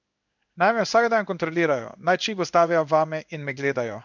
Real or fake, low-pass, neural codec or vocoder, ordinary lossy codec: fake; 7.2 kHz; codec, 16 kHz in and 24 kHz out, 1 kbps, XY-Tokenizer; none